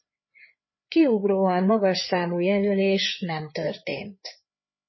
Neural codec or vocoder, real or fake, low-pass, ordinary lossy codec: codec, 16 kHz, 4 kbps, FreqCodec, larger model; fake; 7.2 kHz; MP3, 24 kbps